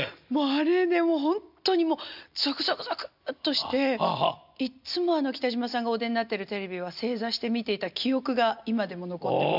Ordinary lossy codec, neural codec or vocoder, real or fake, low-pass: none; none; real; 5.4 kHz